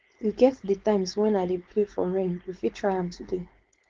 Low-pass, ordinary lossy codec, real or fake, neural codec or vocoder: 7.2 kHz; Opus, 16 kbps; fake; codec, 16 kHz, 4.8 kbps, FACodec